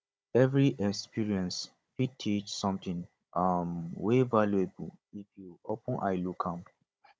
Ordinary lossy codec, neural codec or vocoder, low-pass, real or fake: none; codec, 16 kHz, 16 kbps, FunCodec, trained on Chinese and English, 50 frames a second; none; fake